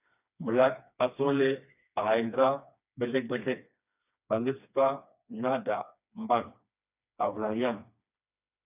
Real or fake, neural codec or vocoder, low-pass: fake; codec, 16 kHz, 2 kbps, FreqCodec, smaller model; 3.6 kHz